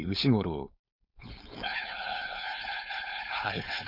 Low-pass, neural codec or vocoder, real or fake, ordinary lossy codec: 5.4 kHz; codec, 16 kHz, 4.8 kbps, FACodec; fake; none